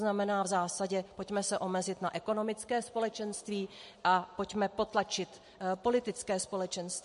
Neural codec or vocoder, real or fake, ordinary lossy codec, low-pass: none; real; MP3, 48 kbps; 10.8 kHz